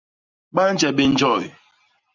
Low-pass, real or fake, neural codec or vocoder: 7.2 kHz; real; none